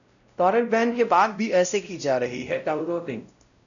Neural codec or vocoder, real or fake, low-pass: codec, 16 kHz, 0.5 kbps, X-Codec, WavLM features, trained on Multilingual LibriSpeech; fake; 7.2 kHz